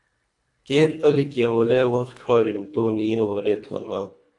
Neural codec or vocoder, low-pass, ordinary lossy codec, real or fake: codec, 24 kHz, 1.5 kbps, HILCodec; 10.8 kHz; AAC, 64 kbps; fake